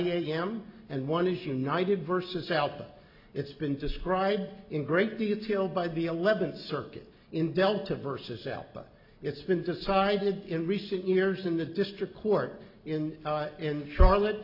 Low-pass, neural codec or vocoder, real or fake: 5.4 kHz; none; real